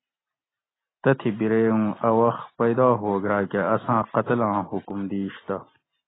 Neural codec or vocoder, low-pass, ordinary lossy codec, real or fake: none; 7.2 kHz; AAC, 16 kbps; real